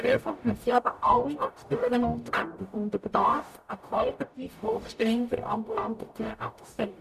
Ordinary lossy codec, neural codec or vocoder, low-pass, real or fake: none; codec, 44.1 kHz, 0.9 kbps, DAC; 14.4 kHz; fake